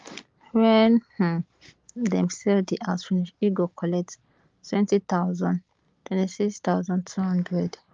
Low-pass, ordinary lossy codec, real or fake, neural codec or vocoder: 7.2 kHz; Opus, 32 kbps; real; none